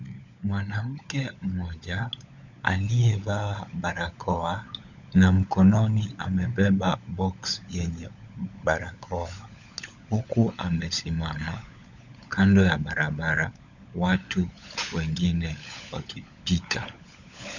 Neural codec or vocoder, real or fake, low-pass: codec, 16 kHz, 16 kbps, FunCodec, trained on LibriTTS, 50 frames a second; fake; 7.2 kHz